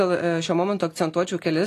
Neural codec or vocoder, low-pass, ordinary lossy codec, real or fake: none; 14.4 kHz; AAC, 48 kbps; real